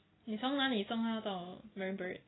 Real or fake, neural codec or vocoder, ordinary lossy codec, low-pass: real; none; AAC, 16 kbps; 7.2 kHz